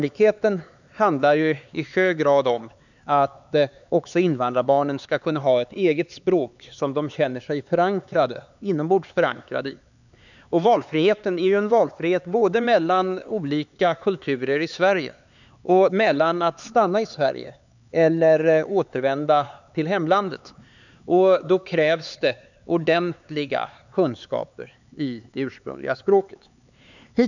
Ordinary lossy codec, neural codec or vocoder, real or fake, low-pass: none; codec, 16 kHz, 4 kbps, X-Codec, HuBERT features, trained on LibriSpeech; fake; 7.2 kHz